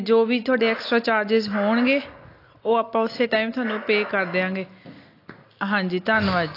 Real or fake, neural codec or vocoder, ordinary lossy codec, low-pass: real; none; AAC, 32 kbps; 5.4 kHz